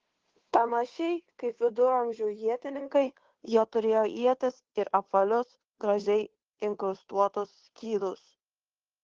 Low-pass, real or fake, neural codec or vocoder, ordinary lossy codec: 7.2 kHz; fake; codec, 16 kHz, 2 kbps, FunCodec, trained on Chinese and English, 25 frames a second; Opus, 16 kbps